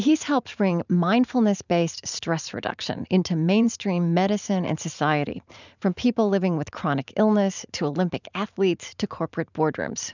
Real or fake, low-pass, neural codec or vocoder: fake; 7.2 kHz; vocoder, 44.1 kHz, 128 mel bands every 512 samples, BigVGAN v2